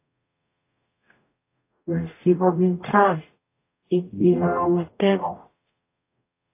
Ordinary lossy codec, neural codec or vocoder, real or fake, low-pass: AAC, 24 kbps; codec, 44.1 kHz, 0.9 kbps, DAC; fake; 3.6 kHz